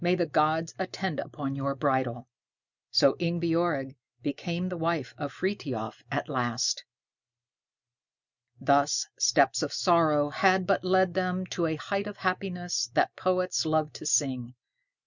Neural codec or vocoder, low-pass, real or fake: none; 7.2 kHz; real